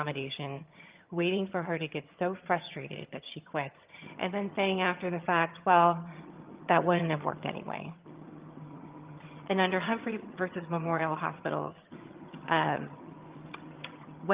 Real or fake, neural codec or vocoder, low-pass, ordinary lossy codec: fake; vocoder, 22.05 kHz, 80 mel bands, HiFi-GAN; 3.6 kHz; Opus, 32 kbps